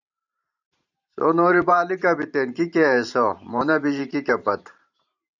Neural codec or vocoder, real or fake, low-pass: vocoder, 44.1 kHz, 128 mel bands every 512 samples, BigVGAN v2; fake; 7.2 kHz